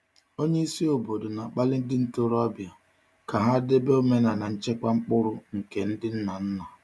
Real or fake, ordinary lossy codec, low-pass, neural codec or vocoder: real; none; none; none